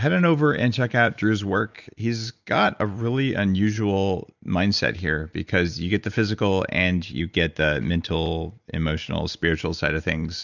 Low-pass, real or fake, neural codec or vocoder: 7.2 kHz; real; none